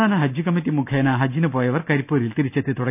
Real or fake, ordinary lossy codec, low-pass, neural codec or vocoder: real; none; 3.6 kHz; none